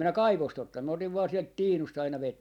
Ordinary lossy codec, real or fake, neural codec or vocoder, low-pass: none; real; none; 19.8 kHz